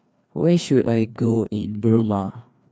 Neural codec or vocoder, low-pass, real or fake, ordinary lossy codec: codec, 16 kHz, 2 kbps, FreqCodec, larger model; none; fake; none